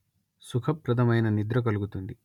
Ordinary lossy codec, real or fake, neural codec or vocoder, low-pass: none; real; none; 19.8 kHz